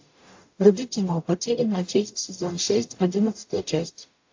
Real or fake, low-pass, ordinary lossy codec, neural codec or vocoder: fake; 7.2 kHz; AAC, 48 kbps; codec, 44.1 kHz, 0.9 kbps, DAC